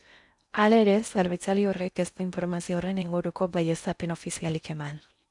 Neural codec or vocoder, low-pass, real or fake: codec, 16 kHz in and 24 kHz out, 0.6 kbps, FocalCodec, streaming, 2048 codes; 10.8 kHz; fake